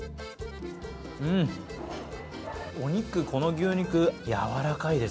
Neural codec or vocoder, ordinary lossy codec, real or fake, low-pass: none; none; real; none